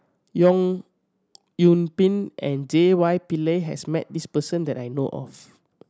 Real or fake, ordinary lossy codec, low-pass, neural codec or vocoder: real; none; none; none